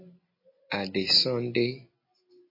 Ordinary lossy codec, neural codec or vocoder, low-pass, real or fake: MP3, 24 kbps; none; 5.4 kHz; real